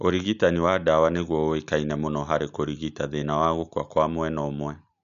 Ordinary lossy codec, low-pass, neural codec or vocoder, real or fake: none; 7.2 kHz; none; real